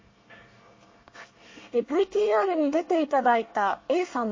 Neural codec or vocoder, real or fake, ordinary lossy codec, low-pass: codec, 24 kHz, 1 kbps, SNAC; fake; MP3, 32 kbps; 7.2 kHz